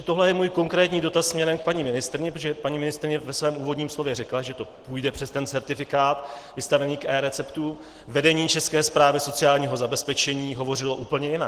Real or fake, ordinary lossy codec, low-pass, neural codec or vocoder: real; Opus, 16 kbps; 14.4 kHz; none